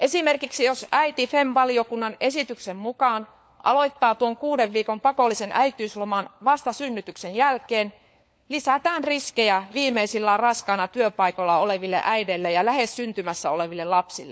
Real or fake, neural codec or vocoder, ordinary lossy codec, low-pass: fake; codec, 16 kHz, 4 kbps, FunCodec, trained on LibriTTS, 50 frames a second; none; none